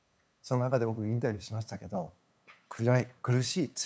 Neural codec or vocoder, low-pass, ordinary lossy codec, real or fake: codec, 16 kHz, 8 kbps, FunCodec, trained on LibriTTS, 25 frames a second; none; none; fake